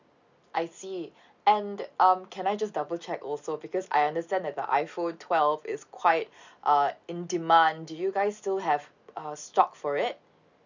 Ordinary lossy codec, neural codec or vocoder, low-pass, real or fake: none; none; 7.2 kHz; real